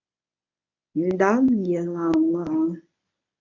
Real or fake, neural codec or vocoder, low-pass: fake; codec, 24 kHz, 0.9 kbps, WavTokenizer, medium speech release version 1; 7.2 kHz